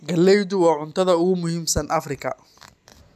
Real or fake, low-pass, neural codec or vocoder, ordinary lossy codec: real; 14.4 kHz; none; none